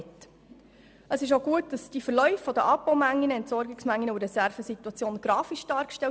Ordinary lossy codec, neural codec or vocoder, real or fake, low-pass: none; none; real; none